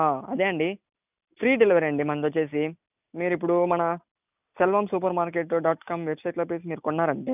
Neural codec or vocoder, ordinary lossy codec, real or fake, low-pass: none; none; real; 3.6 kHz